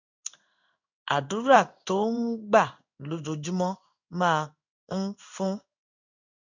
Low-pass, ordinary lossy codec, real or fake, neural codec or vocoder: 7.2 kHz; none; fake; codec, 16 kHz in and 24 kHz out, 1 kbps, XY-Tokenizer